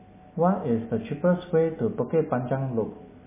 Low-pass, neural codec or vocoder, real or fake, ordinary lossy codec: 3.6 kHz; none; real; MP3, 16 kbps